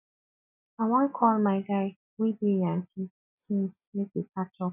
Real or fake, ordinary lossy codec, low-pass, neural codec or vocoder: real; none; 3.6 kHz; none